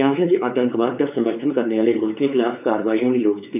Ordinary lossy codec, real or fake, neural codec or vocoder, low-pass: none; fake; codec, 16 kHz, 4 kbps, X-Codec, WavLM features, trained on Multilingual LibriSpeech; 3.6 kHz